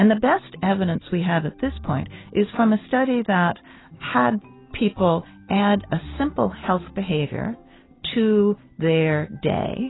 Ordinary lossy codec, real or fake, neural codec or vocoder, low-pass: AAC, 16 kbps; real; none; 7.2 kHz